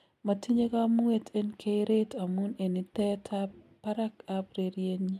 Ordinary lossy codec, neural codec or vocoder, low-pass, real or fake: MP3, 96 kbps; none; 14.4 kHz; real